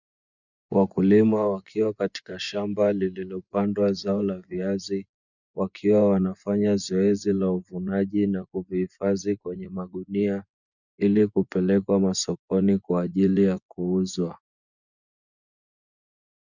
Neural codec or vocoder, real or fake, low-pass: vocoder, 24 kHz, 100 mel bands, Vocos; fake; 7.2 kHz